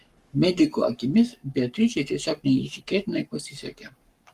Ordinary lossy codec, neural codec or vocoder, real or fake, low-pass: Opus, 32 kbps; codec, 44.1 kHz, 7.8 kbps, Pupu-Codec; fake; 14.4 kHz